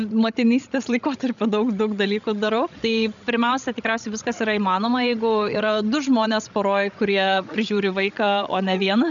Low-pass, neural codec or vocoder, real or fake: 7.2 kHz; codec, 16 kHz, 16 kbps, FreqCodec, larger model; fake